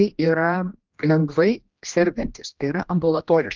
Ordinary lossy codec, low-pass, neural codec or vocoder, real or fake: Opus, 24 kbps; 7.2 kHz; codec, 16 kHz, 1 kbps, X-Codec, HuBERT features, trained on general audio; fake